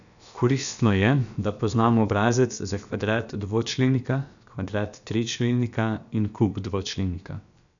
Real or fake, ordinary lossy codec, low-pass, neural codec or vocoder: fake; none; 7.2 kHz; codec, 16 kHz, about 1 kbps, DyCAST, with the encoder's durations